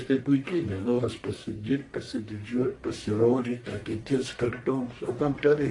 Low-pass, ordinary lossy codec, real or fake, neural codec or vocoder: 10.8 kHz; AAC, 48 kbps; fake; codec, 44.1 kHz, 1.7 kbps, Pupu-Codec